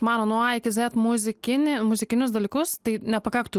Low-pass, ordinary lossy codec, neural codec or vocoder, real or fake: 14.4 kHz; Opus, 24 kbps; none; real